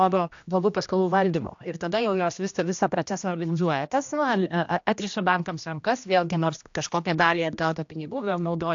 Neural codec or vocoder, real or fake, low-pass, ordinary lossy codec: codec, 16 kHz, 1 kbps, X-Codec, HuBERT features, trained on general audio; fake; 7.2 kHz; AAC, 64 kbps